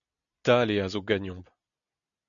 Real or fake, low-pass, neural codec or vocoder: real; 7.2 kHz; none